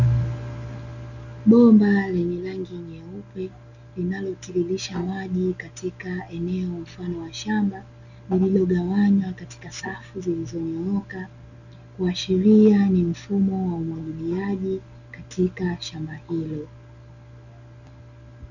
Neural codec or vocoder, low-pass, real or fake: none; 7.2 kHz; real